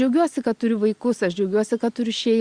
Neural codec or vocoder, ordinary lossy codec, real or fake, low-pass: none; Opus, 64 kbps; real; 9.9 kHz